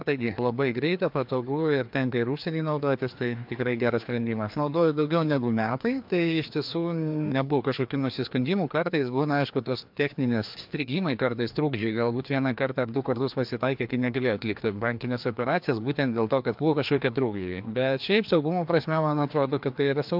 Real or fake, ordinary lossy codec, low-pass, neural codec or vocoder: fake; MP3, 48 kbps; 5.4 kHz; codec, 16 kHz, 2 kbps, FreqCodec, larger model